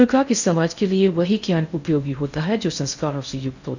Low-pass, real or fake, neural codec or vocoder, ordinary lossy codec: 7.2 kHz; fake; codec, 16 kHz in and 24 kHz out, 0.6 kbps, FocalCodec, streaming, 4096 codes; none